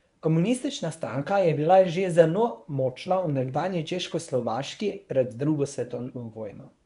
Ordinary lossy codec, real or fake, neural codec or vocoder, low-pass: none; fake; codec, 24 kHz, 0.9 kbps, WavTokenizer, medium speech release version 1; 10.8 kHz